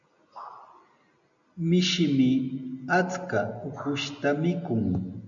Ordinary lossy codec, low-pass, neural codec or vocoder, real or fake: MP3, 96 kbps; 7.2 kHz; none; real